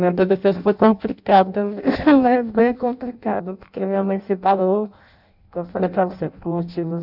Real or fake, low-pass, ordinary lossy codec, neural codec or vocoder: fake; 5.4 kHz; none; codec, 16 kHz in and 24 kHz out, 0.6 kbps, FireRedTTS-2 codec